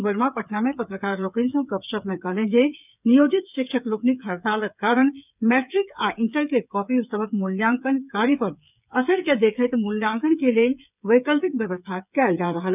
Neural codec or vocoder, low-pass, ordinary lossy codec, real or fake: codec, 16 kHz, 8 kbps, FreqCodec, smaller model; 3.6 kHz; none; fake